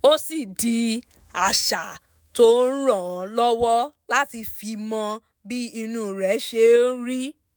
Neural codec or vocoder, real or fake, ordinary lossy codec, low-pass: autoencoder, 48 kHz, 128 numbers a frame, DAC-VAE, trained on Japanese speech; fake; none; none